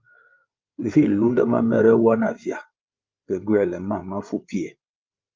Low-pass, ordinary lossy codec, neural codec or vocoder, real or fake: 7.2 kHz; Opus, 24 kbps; codec, 16 kHz, 8 kbps, FreqCodec, larger model; fake